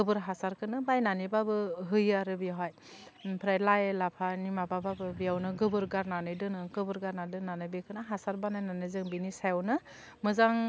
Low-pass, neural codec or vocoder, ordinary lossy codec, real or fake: none; none; none; real